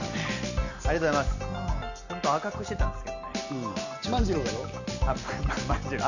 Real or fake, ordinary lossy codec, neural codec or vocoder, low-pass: real; none; none; 7.2 kHz